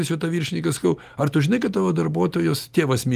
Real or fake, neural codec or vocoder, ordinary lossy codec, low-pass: real; none; Opus, 32 kbps; 14.4 kHz